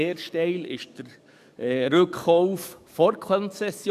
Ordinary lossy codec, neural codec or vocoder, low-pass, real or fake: none; codec, 44.1 kHz, 7.8 kbps, DAC; 14.4 kHz; fake